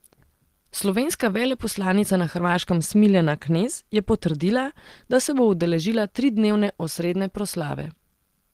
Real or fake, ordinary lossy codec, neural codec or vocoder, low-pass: real; Opus, 16 kbps; none; 14.4 kHz